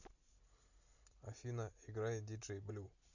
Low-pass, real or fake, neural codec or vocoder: 7.2 kHz; real; none